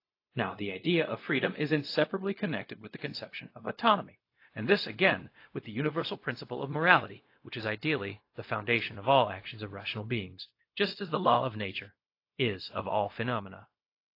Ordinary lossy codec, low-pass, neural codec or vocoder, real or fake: AAC, 32 kbps; 5.4 kHz; codec, 16 kHz, 0.4 kbps, LongCat-Audio-Codec; fake